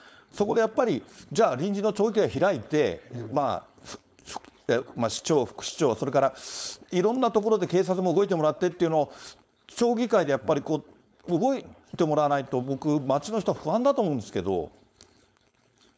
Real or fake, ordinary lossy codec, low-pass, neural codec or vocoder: fake; none; none; codec, 16 kHz, 4.8 kbps, FACodec